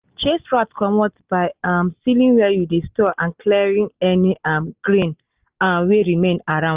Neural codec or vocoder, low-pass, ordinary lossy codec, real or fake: none; 3.6 kHz; Opus, 32 kbps; real